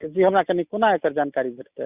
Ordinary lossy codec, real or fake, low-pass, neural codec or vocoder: Opus, 64 kbps; real; 3.6 kHz; none